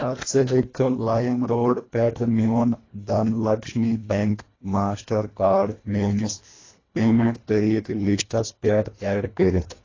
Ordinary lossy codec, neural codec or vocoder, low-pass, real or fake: AAC, 32 kbps; codec, 24 kHz, 1.5 kbps, HILCodec; 7.2 kHz; fake